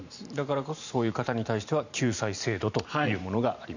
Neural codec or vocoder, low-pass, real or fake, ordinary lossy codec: vocoder, 44.1 kHz, 128 mel bands every 512 samples, BigVGAN v2; 7.2 kHz; fake; none